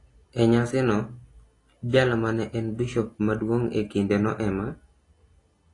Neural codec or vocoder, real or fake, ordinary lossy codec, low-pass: none; real; AAC, 32 kbps; 10.8 kHz